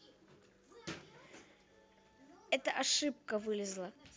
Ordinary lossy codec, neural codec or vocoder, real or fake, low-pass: none; none; real; none